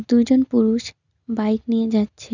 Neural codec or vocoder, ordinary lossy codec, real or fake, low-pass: none; none; real; 7.2 kHz